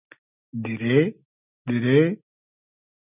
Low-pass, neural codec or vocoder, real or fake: 3.6 kHz; none; real